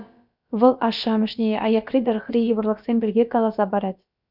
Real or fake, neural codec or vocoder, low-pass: fake; codec, 16 kHz, about 1 kbps, DyCAST, with the encoder's durations; 5.4 kHz